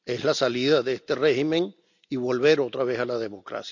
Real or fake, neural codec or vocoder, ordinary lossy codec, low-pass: real; none; none; 7.2 kHz